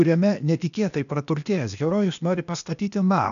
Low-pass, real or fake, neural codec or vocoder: 7.2 kHz; fake; codec, 16 kHz, 0.8 kbps, ZipCodec